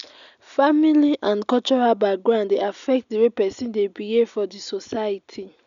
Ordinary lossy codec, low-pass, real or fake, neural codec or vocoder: none; 7.2 kHz; real; none